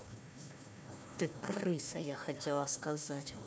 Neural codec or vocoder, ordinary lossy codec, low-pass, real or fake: codec, 16 kHz, 1 kbps, FunCodec, trained on Chinese and English, 50 frames a second; none; none; fake